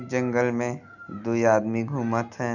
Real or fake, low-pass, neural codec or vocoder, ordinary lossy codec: real; 7.2 kHz; none; none